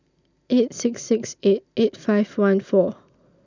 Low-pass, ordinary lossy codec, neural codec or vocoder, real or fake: 7.2 kHz; none; none; real